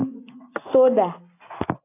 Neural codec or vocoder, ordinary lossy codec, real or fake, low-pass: none; AAC, 24 kbps; real; 3.6 kHz